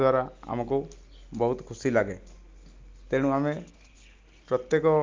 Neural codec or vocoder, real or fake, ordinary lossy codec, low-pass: none; real; Opus, 32 kbps; 7.2 kHz